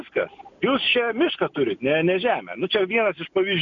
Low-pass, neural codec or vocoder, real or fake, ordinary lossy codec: 7.2 kHz; none; real; MP3, 96 kbps